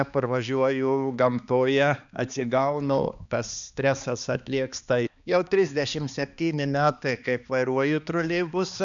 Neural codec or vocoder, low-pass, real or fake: codec, 16 kHz, 2 kbps, X-Codec, HuBERT features, trained on balanced general audio; 7.2 kHz; fake